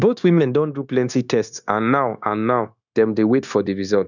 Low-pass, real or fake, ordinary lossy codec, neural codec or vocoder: 7.2 kHz; fake; none; codec, 16 kHz, 0.9 kbps, LongCat-Audio-Codec